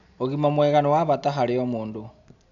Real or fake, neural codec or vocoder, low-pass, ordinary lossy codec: real; none; 7.2 kHz; none